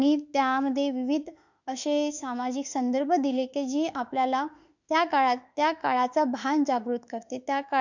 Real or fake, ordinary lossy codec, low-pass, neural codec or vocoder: fake; none; 7.2 kHz; autoencoder, 48 kHz, 32 numbers a frame, DAC-VAE, trained on Japanese speech